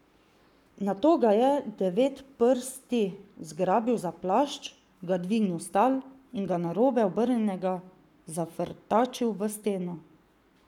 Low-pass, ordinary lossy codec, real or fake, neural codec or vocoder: 19.8 kHz; none; fake; codec, 44.1 kHz, 7.8 kbps, Pupu-Codec